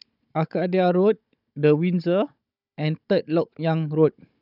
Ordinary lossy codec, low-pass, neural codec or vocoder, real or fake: none; 5.4 kHz; codec, 16 kHz, 16 kbps, FunCodec, trained on Chinese and English, 50 frames a second; fake